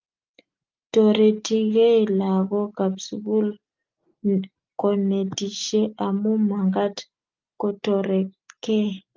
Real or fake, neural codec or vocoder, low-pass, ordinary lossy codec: real; none; 7.2 kHz; Opus, 32 kbps